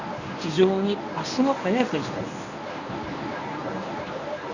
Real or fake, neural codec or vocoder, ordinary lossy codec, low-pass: fake; codec, 24 kHz, 0.9 kbps, WavTokenizer, medium speech release version 1; none; 7.2 kHz